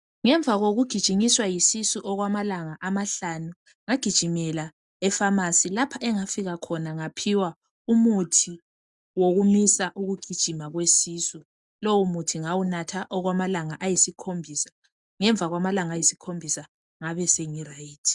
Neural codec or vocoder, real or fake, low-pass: none; real; 10.8 kHz